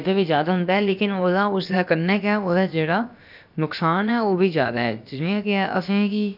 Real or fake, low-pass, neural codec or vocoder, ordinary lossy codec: fake; 5.4 kHz; codec, 16 kHz, about 1 kbps, DyCAST, with the encoder's durations; none